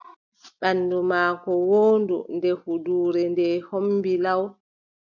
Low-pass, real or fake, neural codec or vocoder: 7.2 kHz; real; none